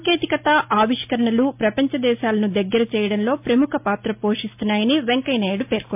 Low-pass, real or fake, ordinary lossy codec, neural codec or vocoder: 3.6 kHz; real; MP3, 32 kbps; none